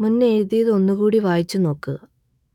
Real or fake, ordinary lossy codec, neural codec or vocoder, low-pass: fake; none; autoencoder, 48 kHz, 128 numbers a frame, DAC-VAE, trained on Japanese speech; 19.8 kHz